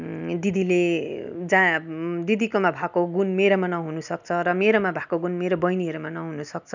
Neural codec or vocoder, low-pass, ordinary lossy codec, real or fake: none; 7.2 kHz; none; real